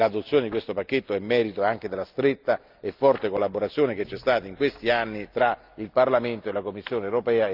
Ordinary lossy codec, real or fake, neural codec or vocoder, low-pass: Opus, 24 kbps; real; none; 5.4 kHz